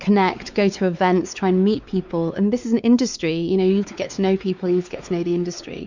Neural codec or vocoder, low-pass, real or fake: codec, 16 kHz, 4 kbps, X-Codec, WavLM features, trained on Multilingual LibriSpeech; 7.2 kHz; fake